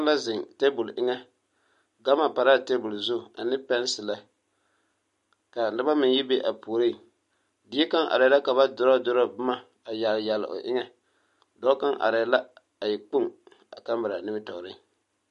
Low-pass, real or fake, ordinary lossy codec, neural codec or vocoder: 14.4 kHz; real; MP3, 48 kbps; none